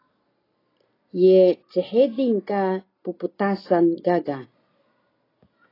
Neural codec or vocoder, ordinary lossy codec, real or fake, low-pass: none; AAC, 24 kbps; real; 5.4 kHz